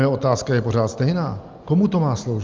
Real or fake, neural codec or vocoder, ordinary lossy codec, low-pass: real; none; Opus, 32 kbps; 7.2 kHz